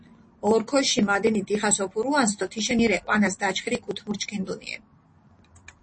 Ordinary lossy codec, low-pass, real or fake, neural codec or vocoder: MP3, 32 kbps; 9.9 kHz; real; none